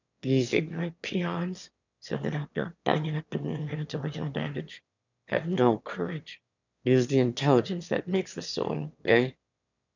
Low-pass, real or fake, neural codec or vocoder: 7.2 kHz; fake; autoencoder, 22.05 kHz, a latent of 192 numbers a frame, VITS, trained on one speaker